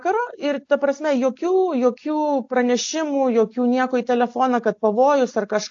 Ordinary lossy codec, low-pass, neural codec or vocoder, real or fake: AAC, 48 kbps; 7.2 kHz; none; real